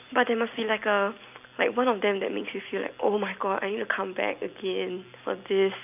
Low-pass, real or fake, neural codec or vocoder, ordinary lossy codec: 3.6 kHz; real; none; none